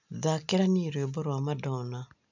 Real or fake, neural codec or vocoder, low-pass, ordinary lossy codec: real; none; 7.2 kHz; none